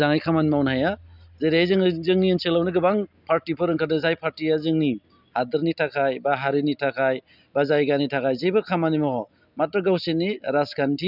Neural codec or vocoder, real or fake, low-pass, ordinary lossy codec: none; real; 5.4 kHz; none